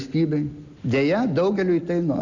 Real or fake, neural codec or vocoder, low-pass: real; none; 7.2 kHz